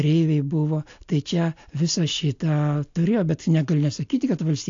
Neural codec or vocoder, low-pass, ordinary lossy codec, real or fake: none; 7.2 kHz; AAC, 48 kbps; real